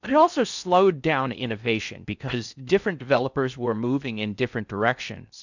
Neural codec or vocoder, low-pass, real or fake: codec, 16 kHz in and 24 kHz out, 0.6 kbps, FocalCodec, streaming, 4096 codes; 7.2 kHz; fake